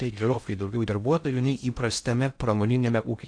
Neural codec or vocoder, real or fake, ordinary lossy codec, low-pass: codec, 16 kHz in and 24 kHz out, 0.6 kbps, FocalCodec, streaming, 2048 codes; fake; AAC, 64 kbps; 9.9 kHz